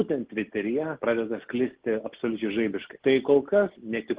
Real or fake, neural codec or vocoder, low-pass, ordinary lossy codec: real; none; 3.6 kHz; Opus, 16 kbps